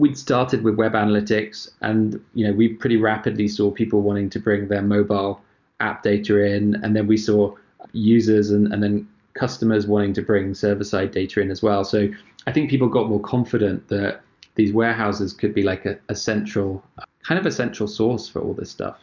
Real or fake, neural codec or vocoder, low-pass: real; none; 7.2 kHz